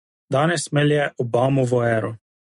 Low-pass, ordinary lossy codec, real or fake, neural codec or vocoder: 10.8 kHz; MP3, 48 kbps; real; none